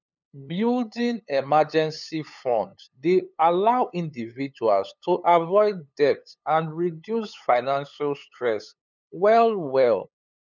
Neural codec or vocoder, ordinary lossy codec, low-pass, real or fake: codec, 16 kHz, 8 kbps, FunCodec, trained on LibriTTS, 25 frames a second; none; 7.2 kHz; fake